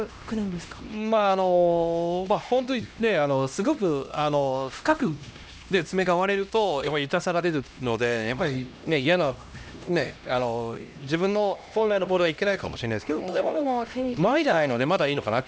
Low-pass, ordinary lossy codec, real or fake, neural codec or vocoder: none; none; fake; codec, 16 kHz, 1 kbps, X-Codec, HuBERT features, trained on LibriSpeech